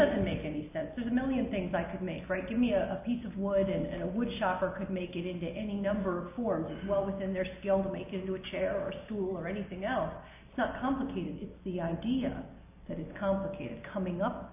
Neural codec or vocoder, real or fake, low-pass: none; real; 3.6 kHz